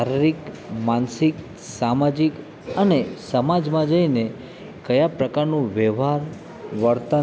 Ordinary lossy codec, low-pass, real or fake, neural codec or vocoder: none; none; real; none